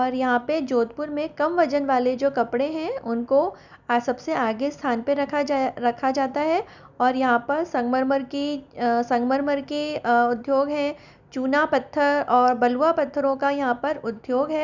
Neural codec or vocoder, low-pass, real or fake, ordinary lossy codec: none; 7.2 kHz; real; none